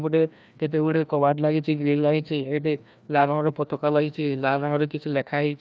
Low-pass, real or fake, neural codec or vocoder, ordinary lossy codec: none; fake; codec, 16 kHz, 1 kbps, FreqCodec, larger model; none